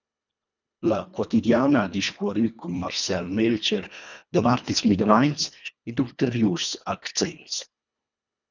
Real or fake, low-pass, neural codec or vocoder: fake; 7.2 kHz; codec, 24 kHz, 1.5 kbps, HILCodec